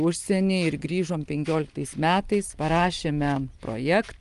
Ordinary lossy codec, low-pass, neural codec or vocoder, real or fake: Opus, 32 kbps; 10.8 kHz; none; real